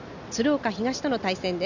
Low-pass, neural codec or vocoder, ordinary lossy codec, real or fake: 7.2 kHz; none; none; real